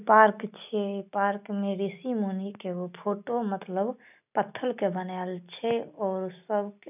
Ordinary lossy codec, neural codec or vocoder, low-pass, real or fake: none; none; 3.6 kHz; real